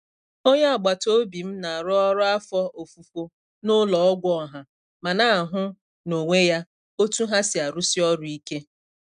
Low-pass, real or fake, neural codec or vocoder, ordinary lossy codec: 9.9 kHz; real; none; none